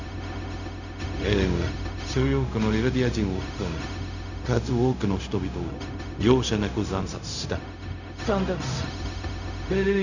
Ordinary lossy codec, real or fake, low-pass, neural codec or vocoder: none; fake; 7.2 kHz; codec, 16 kHz, 0.4 kbps, LongCat-Audio-Codec